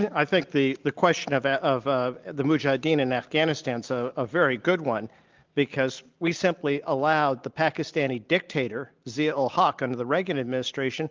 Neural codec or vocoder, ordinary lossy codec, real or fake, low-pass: none; Opus, 32 kbps; real; 7.2 kHz